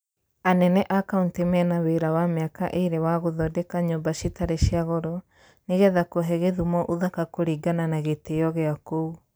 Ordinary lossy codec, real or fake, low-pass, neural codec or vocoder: none; real; none; none